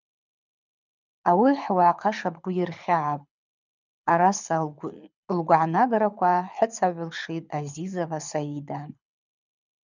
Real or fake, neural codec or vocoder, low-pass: fake; codec, 24 kHz, 6 kbps, HILCodec; 7.2 kHz